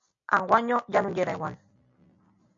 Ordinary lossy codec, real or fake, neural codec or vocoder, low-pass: AAC, 64 kbps; real; none; 7.2 kHz